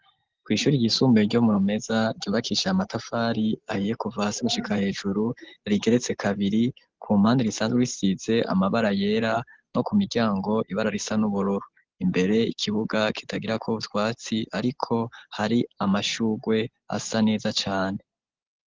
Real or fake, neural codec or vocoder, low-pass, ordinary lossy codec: real; none; 7.2 kHz; Opus, 16 kbps